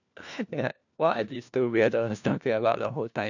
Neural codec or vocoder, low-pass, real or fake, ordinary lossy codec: codec, 16 kHz, 1 kbps, FunCodec, trained on LibriTTS, 50 frames a second; 7.2 kHz; fake; none